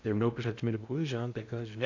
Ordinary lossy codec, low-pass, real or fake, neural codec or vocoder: AAC, 48 kbps; 7.2 kHz; fake; codec, 16 kHz in and 24 kHz out, 0.8 kbps, FocalCodec, streaming, 65536 codes